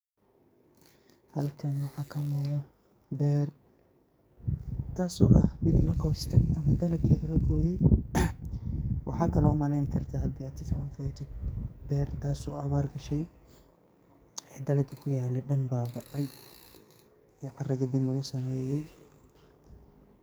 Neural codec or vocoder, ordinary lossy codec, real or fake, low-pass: codec, 44.1 kHz, 2.6 kbps, SNAC; none; fake; none